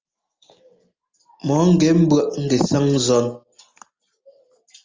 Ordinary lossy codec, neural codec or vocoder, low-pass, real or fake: Opus, 32 kbps; none; 7.2 kHz; real